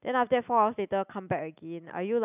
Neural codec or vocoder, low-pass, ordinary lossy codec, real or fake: none; 3.6 kHz; none; real